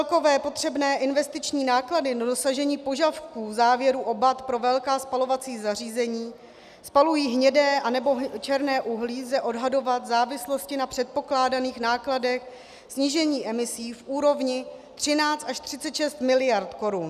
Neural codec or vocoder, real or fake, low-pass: none; real; 14.4 kHz